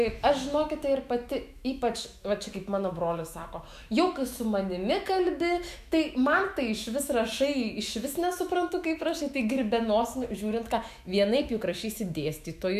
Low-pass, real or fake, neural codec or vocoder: 14.4 kHz; fake; autoencoder, 48 kHz, 128 numbers a frame, DAC-VAE, trained on Japanese speech